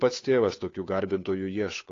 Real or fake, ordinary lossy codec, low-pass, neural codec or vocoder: fake; AAC, 32 kbps; 7.2 kHz; codec, 16 kHz, 8 kbps, FunCodec, trained on Chinese and English, 25 frames a second